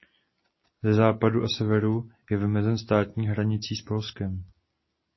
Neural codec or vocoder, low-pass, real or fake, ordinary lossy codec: none; 7.2 kHz; real; MP3, 24 kbps